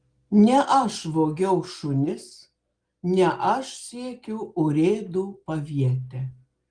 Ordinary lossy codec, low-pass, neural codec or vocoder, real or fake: Opus, 24 kbps; 9.9 kHz; none; real